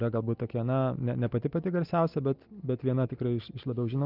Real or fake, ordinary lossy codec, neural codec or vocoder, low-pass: real; Opus, 32 kbps; none; 5.4 kHz